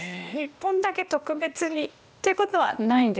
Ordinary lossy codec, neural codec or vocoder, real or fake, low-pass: none; codec, 16 kHz, 0.8 kbps, ZipCodec; fake; none